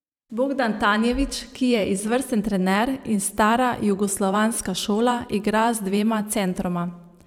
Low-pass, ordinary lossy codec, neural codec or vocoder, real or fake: 19.8 kHz; none; vocoder, 44.1 kHz, 128 mel bands every 512 samples, BigVGAN v2; fake